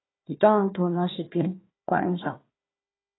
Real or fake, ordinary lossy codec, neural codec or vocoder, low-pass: fake; AAC, 16 kbps; codec, 16 kHz, 1 kbps, FunCodec, trained on Chinese and English, 50 frames a second; 7.2 kHz